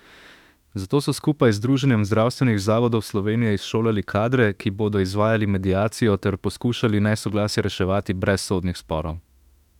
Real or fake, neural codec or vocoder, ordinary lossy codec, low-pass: fake; autoencoder, 48 kHz, 32 numbers a frame, DAC-VAE, trained on Japanese speech; none; 19.8 kHz